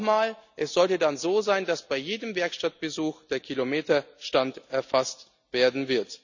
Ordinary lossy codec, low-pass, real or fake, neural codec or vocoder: none; 7.2 kHz; real; none